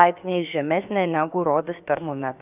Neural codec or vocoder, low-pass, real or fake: codec, 16 kHz, about 1 kbps, DyCAST, with the encoder's durations; 3.6 kHz; fake